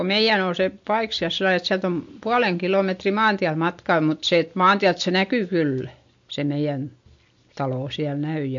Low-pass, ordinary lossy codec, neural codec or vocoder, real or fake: 7.2 kHz; MP3, 48 kbps; none; real